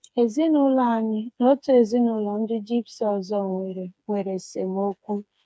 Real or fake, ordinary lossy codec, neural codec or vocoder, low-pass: fake; none; codec, 16 kHz, 4 kbps, FreqCodec, smaller model; none